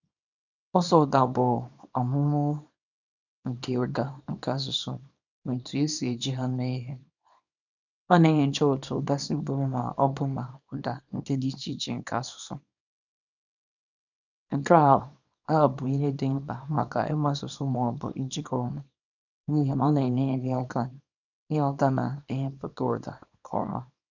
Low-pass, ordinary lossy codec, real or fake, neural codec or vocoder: 7.2 kHz; none; fake; codec, 24 kHz, 0.9 kbps, WavTokenizer, small release